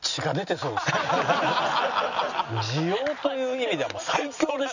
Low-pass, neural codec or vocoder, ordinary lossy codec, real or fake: 7.2 kHz; none; none; real